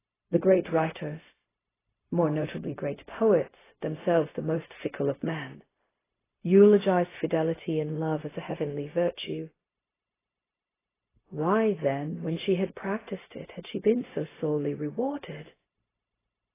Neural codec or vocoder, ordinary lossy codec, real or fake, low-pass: codec, 16 kHz, 0.4 kbps, LongCat-Audio-Codec; AAC, 16 kbps; fake; 3.6 kHz